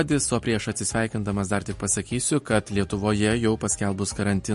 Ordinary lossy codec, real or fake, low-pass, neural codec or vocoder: MP3, 48 kbps; real; 14.4 kHz; none